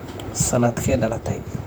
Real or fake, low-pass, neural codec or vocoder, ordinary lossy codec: fake; none; vocoder, 44.1 kHz, 128 mel bands, Pupu-Vocoder; none